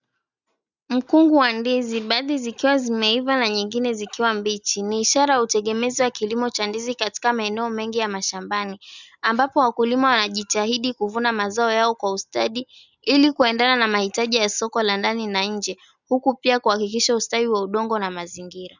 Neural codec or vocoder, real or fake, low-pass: none; real; 7.2 kHz